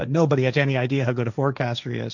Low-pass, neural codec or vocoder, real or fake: 7.2 kHz; codec, 16 kHz, 1.1 kbps, Voila-Tokenizer; fake